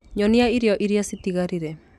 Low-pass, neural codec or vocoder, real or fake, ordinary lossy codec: 14.4 kHz; none; real; none